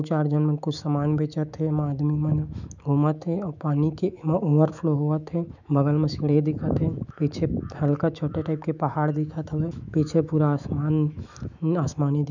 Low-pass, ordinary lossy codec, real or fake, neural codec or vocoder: 7.2 kHz; none; fake; autoencoder, 48 kHz, 128 numbers a frame, DAC-VAE, trained on Japanese speech